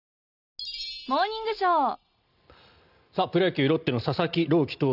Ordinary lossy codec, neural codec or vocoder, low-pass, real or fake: none; none; 5.4 kHz; real